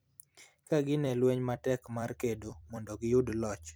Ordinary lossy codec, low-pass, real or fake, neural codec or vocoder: none; none; fake; vocoder, 44.1 kHz, 128 mel bands every 256 samples, BigVGAN v2